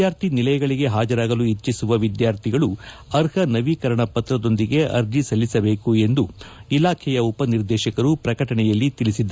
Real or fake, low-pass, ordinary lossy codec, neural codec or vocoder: real; none; none; none